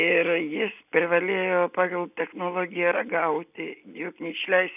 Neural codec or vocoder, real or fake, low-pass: none; real; 3.6 kHz